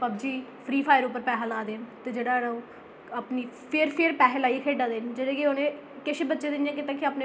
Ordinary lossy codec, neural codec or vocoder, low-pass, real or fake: none; none; none; real